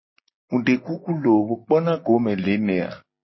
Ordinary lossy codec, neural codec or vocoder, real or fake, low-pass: MP3, 24 kbps; none; real; 7.2 kHz